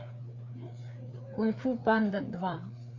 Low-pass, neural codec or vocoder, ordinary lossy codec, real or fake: 7.2 kHz; codec, 16 kHz, 4 kbps, FreqCodec, larger model; MP3, 48 kbps; fake